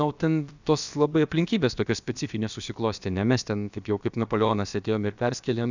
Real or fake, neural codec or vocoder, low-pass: fake; codec, 16 kHz, about 1 kbps, DyCAST, with the encoder's durations; 7.2 kHz